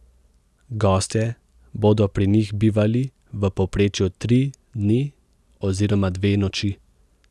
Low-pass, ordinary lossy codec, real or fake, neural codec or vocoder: none; none; real; none